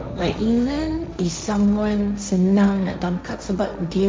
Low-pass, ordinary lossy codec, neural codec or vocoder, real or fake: none; none; codec, 16 kHz, 1.1 kbps, Voila-Tokenizer; fake